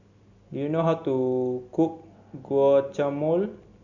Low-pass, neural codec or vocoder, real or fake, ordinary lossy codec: 7.2 kHz; none; real; Opus, 64 kbps